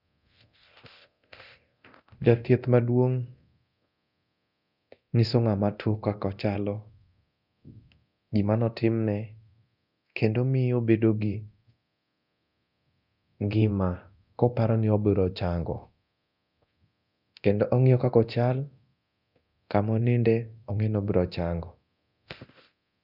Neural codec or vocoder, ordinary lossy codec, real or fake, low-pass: codec, 24 kHz, 0.9 kbps, DualCodec; none; fake; 5.4 kHz